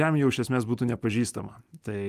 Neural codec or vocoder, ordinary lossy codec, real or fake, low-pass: none; Opus, 32 kbps; real; 14.4 kHz